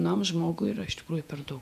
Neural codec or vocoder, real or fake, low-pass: vocoder, 48 kHz, 128 mel bands, Vocos; fake; 14.4 kHz